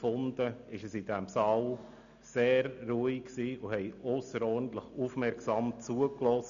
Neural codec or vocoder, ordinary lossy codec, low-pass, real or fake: none; none; 7.2 kHz; real